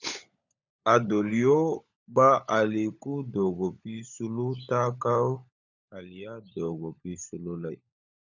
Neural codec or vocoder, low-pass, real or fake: codec, 16 kHz, 16 kbps, FunCodec, trained on LibriTTS, 50 frames a second; 7.2 kHz; fake